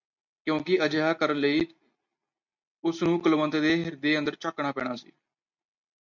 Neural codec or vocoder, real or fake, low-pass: none; real; 7.2 kHz